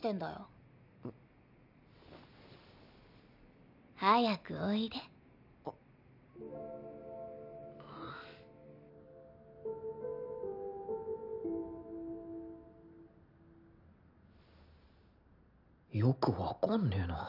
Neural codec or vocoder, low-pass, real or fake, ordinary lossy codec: none; 5.4 kHz; real; none